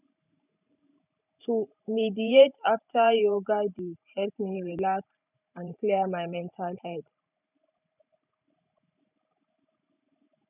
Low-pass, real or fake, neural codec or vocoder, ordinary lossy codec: 3.6 kHz; fake; vocoder, 44.1 kHz, 128 mel bands every 512 samples, BigVGAN v2; none